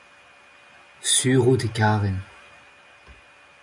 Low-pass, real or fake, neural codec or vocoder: 10.8 kHz; real; none